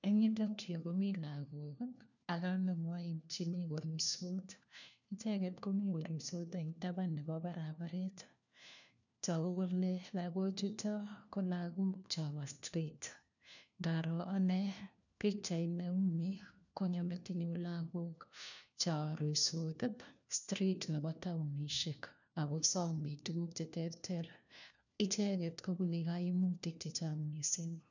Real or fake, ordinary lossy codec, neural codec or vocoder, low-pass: fake; none; codec, 16 kHz, 1 kbps, FunCodec, trained on LibriTTS, 50 frames a second; 7.2 kHz